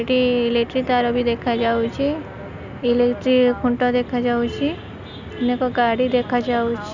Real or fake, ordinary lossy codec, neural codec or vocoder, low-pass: real; none; none; 7.2 kHz